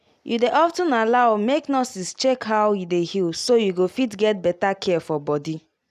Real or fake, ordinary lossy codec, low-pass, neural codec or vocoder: real; none; 14.4 kHz; none